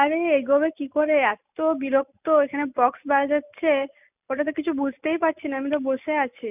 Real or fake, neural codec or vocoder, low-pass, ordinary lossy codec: real; none; 3.6 kHz; none